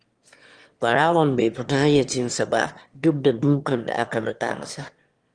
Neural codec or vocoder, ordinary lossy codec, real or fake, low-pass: autoencoder, 22.05 kHz, a latent of 192 numbers a frame, VITS, trained on one speaker; Opus, 24 kbps; fake; 9.9 kHz